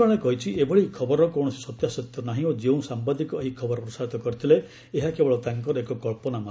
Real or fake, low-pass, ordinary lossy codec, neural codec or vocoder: real; none; none; none